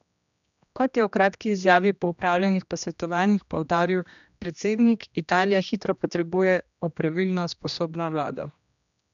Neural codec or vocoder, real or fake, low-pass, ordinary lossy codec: codec, 16 kHz, 1 kbps, X-Codec, HuBERT features, trained on general audio; fake; 7.2 kHz; none